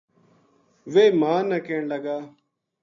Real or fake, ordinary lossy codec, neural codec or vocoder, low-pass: real; MP3, 48 kbps; none; 7.2 kHz